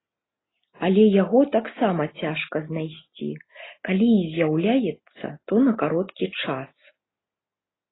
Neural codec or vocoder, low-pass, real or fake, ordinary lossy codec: none; 7.2 kHz; real; AAC, 16 kbps